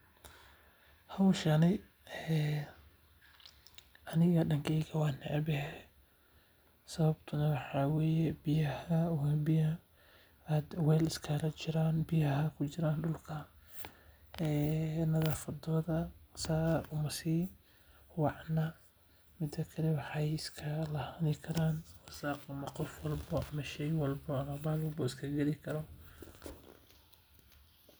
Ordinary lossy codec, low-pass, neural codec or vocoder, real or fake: none; none; none; real